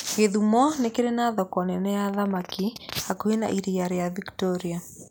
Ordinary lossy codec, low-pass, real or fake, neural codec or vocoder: none; none; real; none